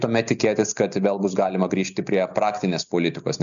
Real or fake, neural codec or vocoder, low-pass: real; none; 7.2 kHz